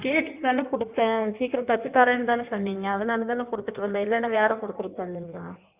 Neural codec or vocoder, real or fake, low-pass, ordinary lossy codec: codec, 16 kHz in and 24 kHz out, 1.1 kbps, FireRedTTS-2 codec; fake; 3.6 kHz; Opus, 64 kbps